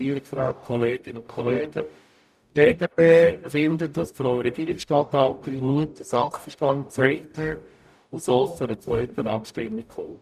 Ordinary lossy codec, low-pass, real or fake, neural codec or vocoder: none; 14.4 kHz; fake; codec, 44.1 kHz, 0.9 kbps, DAC